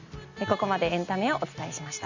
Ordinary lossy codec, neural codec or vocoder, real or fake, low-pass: none; none; real; 7.2 kHz